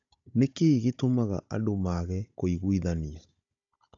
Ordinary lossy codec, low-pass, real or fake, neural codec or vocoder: AAC, 64 kbps; 7.2 kHz; fake; codec, 16 kHz, 16 kbps, FunCodec, trained on Chinese and English, 50 frames a second